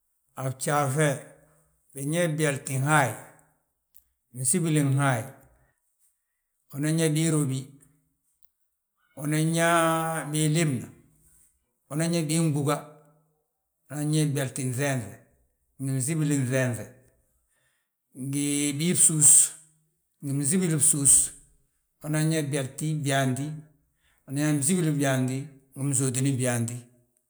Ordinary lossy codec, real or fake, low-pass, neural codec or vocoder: none; real; none; none